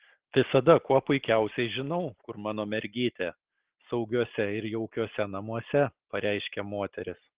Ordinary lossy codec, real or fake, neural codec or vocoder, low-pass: Opus, 16 kbps; real; none; 3.6 kHz